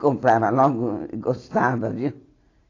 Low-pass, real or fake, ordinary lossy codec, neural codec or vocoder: 7.2 kHz; real; AAC, 32 kbps; none